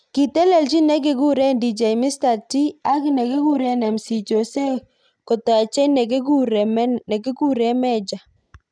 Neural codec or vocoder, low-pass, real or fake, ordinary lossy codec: none; 9.9 kHz; real; none